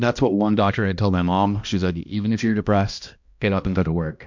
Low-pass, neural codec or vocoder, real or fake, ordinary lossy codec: 7.2 kHz; codec, 16 kHz, 1 kbps, X-Codec, HuBERT features, trained on balanced general audio; fake; MP3, 64 kbps